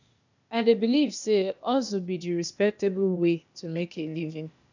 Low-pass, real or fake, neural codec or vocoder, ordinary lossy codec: 7.2 kHz; fake; codec, 16 kHz, 0.8 kbps, ZipCodec; none